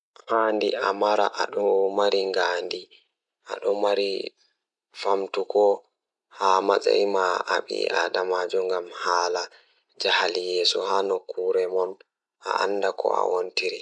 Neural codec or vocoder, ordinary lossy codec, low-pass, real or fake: none; none; 10.8 kHz; real